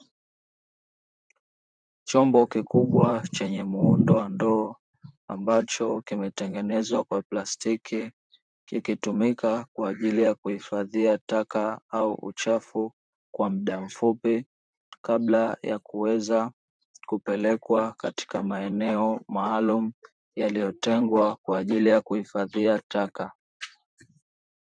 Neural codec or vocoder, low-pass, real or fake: vocoder, 44.1 kHz, 128 mel bands, Pupu-Vocoder; 9.9 kHz; fake